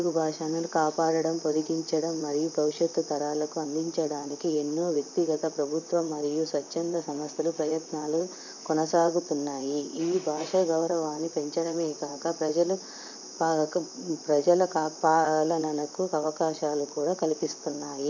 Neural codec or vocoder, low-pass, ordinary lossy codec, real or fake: vocoder, 44.1 kHz, 128 mel bands, Pupu-Vocoder; 7.2 kHz; none; fake